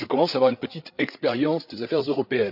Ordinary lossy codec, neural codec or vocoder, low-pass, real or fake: none; codec, 16 kHz, 8 kbps, FreqCodec, larger model; 5.4 kHz; fake